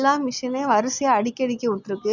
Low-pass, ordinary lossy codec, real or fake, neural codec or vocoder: 7.2 kHz; none; real; none